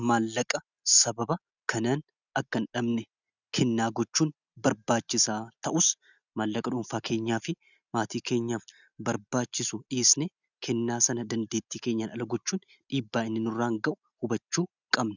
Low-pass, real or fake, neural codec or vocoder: 7.2 kHz; real; none